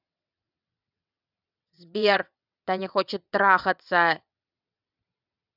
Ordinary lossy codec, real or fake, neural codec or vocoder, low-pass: none; fake; vocoder, 22.05 kHz, 80 mel bands, WaveNeXt; 5.4 kHz